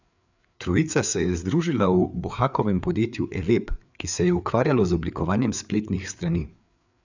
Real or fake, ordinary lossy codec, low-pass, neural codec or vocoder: fake; none; 7.2 kHz; codec, 16 kHz, 4 kbps, FreqCodec, larger model